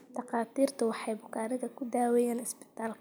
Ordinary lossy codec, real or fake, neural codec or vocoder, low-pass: none; real; none; none